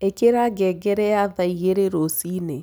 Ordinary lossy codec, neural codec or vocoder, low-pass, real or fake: none; none; none; real